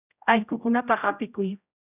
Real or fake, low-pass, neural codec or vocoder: fake; 3.6 kHz; codec, 16 kHz, 0.5 kbps, X-Codec, HuBERT features, trained on general audio